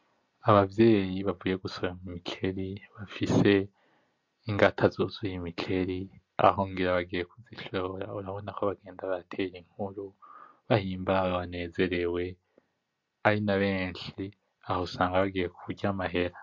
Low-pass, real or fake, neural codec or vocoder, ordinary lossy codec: 7.2 kHz; real; none; MP3, 48 kbps